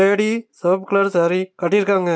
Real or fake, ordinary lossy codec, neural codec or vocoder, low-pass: real; none; none; none